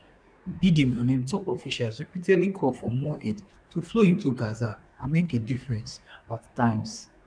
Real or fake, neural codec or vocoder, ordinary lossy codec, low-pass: fake; codec, 24 kHz, 1 kbps, SNAC; none; 9.9 kHz